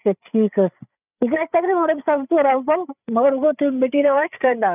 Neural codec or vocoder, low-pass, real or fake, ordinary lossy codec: codec, 16 kHz, 8 kbps, FreqCodec, larger model; 3.6 kHz; fake; none